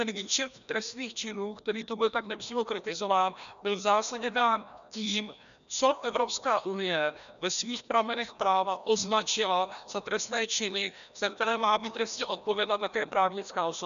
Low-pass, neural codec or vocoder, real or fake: 7.2 kHz; codec, 16 kHz, 1 kbps, FreqCodec, larger model; fake